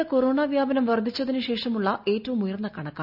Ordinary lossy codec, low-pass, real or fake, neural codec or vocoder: none; 5.4 kHz; real; none